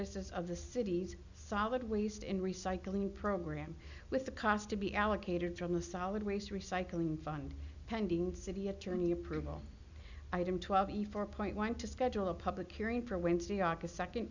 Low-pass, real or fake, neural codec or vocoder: 7.2 kHz; real; none